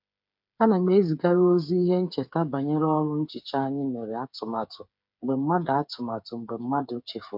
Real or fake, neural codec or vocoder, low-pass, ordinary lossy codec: fake; codec, 16 kHz, 8 kbps, FreqCodec, smaller model; 5.4 kHz; MP3, 48 kbps